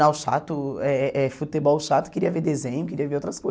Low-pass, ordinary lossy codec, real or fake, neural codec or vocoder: none; none; real; none